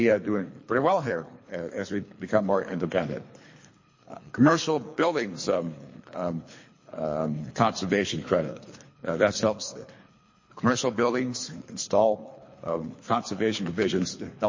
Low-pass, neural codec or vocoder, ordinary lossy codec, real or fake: 7.2 kHz; codec, 24 kHz, 3 kbps, HILCodec; MP3, 32 kbps; fake